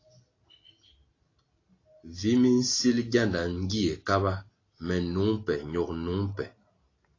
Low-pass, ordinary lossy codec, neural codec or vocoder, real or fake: 7.2 kHz; AAC, 32 kbps; none; real